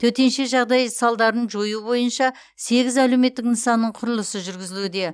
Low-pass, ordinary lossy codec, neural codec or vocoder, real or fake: none; none; none; real